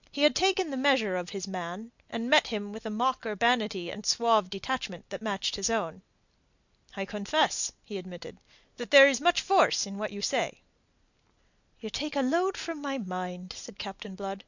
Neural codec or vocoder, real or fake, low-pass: none; real; 7.2 kHz